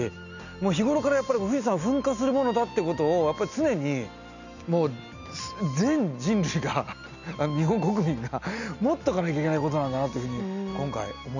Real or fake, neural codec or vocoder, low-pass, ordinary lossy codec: real; none; 7.2 kHz; none